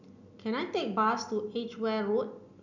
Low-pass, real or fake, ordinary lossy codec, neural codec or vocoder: 7.2 kHz; real; none; none